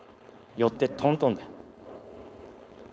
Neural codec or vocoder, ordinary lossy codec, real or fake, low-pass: codec, 16 kHz, 4.8 kbps, FACodec; none; fake; none